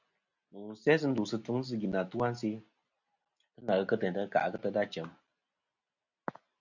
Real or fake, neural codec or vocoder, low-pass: real; none; 7.2 kHz